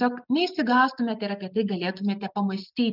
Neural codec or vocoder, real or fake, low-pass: none; real; 5.4 kHz